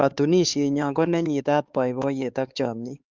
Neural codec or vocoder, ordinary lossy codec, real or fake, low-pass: codec, 16 kHz, 4 kbps, X-Codec, HuBERT features, trained on LibriSpeech; Opus, 24 kbps; fake; 7.2 kHz